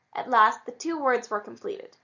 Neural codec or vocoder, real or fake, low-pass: none; real; 7.2 kHz